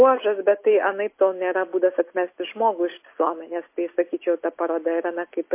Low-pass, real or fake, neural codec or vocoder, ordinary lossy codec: 3.6 kHz; real; none; MP3, 24 kbps